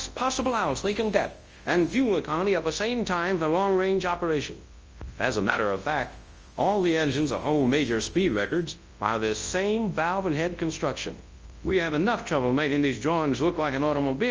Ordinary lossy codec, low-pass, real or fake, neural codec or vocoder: Opus, 24 kbps; 7.2 kHz; fake; codec, 24 kHz, 0.9 kbps, WavTokenizer, large speech release